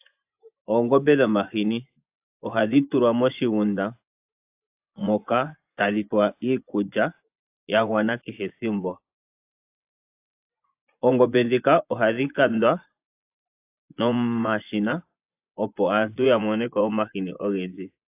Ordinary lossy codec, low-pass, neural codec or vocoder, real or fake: AAC, 32 kbps; 3.6 kHz; vocoder, 24 kHz, 100 mel bands, Vocos; fake